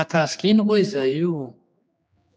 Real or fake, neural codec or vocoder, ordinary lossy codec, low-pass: fake; codec, 16 kHz, 2 kbps, X-Codec, HuBERT features, trained on general audio; none; none